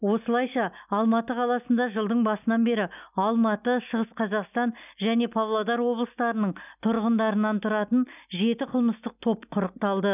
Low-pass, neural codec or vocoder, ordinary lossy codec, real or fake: 3.6 kHz; none; none; real